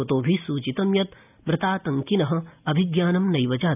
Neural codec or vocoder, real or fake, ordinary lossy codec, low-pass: none; real; none; 3.6 kHz